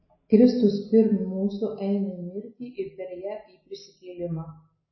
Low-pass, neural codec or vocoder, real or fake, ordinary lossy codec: 7.2 kHz; none; real; MP3, 24 kbps